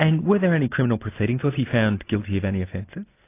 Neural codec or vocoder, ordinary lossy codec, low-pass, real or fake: none; AAC, 24 kbps; 3.6 kHz; real